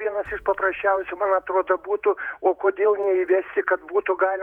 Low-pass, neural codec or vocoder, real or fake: 19.8 kHz; vocoder, 48 kHz, 128 mel bands, Vocos; fake